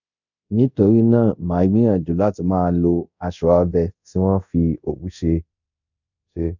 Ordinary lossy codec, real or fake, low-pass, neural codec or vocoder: none; fake; 7.2 kHz; codec, 24 kHz, 0.5 kbps, DualCodec